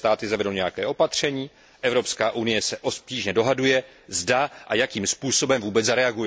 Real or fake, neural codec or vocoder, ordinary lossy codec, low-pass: real; none; none; none